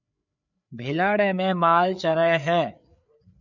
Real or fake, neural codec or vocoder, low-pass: fake; codec, 16 kHz, 4 kbps, FreqCodec, larger model; 7.2 kHz